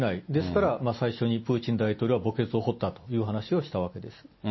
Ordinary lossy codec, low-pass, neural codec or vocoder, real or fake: MP3, 24 kbps; 7.2 kHz; none; real